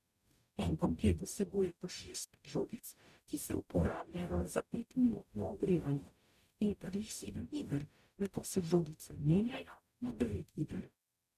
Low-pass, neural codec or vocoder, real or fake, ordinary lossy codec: 14.4 kHz; codec, 44.1 kHz, 0.9 kbps, DAC; fake; none